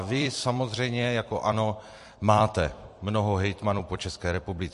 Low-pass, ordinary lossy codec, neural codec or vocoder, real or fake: 14.4 kHz; MP3, 48 kbps; vocoder, 44.1 kHz, 128 mel bands every 256 samples, BigVGAN v2; fake